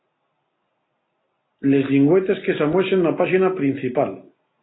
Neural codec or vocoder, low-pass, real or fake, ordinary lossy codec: none; 7.2 kHz; real; AAC, 16 kbps